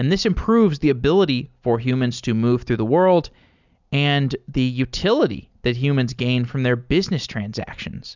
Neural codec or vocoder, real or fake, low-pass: none; real; 7.2 kHz